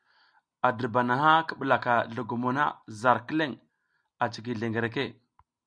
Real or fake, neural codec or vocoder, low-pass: real; none; 9.9 kHz